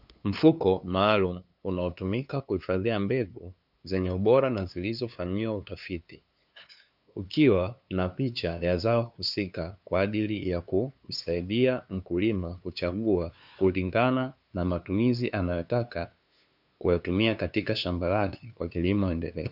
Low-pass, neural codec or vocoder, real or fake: 5.4 kHz; codec, 16 kHz, 2 kbps, FunCodec, trained on LibriTTS, 25 frames a second; fake